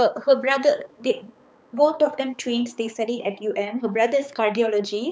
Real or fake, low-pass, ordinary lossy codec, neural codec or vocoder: fake; none; none; codec, 16 kHz, 4 kbps, X-Codec, HuBERT features, trained on balanced general audio